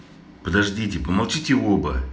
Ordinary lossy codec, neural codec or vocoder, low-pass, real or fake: none; none; none; real